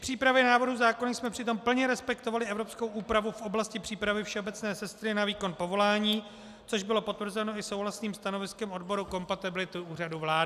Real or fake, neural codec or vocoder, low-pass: real; none; 14.4 kHz